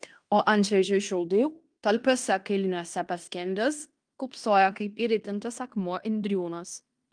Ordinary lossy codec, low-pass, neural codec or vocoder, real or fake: Opus, 32 kbps; 9.9 kHz; codec, 16 kHz in and 24 kHz out, 0.9 kbps, LongCat-Audio-Codec, fine tuned four codebook decoder; fake